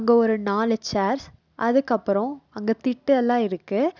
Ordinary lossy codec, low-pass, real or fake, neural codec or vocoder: none; 7.2 kHz; real; none